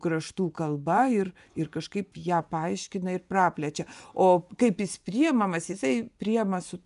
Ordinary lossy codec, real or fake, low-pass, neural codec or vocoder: MP3, 96 kbps; fake; 10.8 kHz; vocoder, 24 kHz, 100 mel bands, Vocos